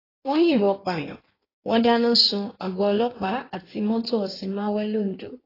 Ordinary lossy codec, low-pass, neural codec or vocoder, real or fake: AAC, 24 kbps; 5.4 kHz; codec, 16 kHz in and 24 kHz out, 1.1 kbps, FireRedTTS-2 codec; fake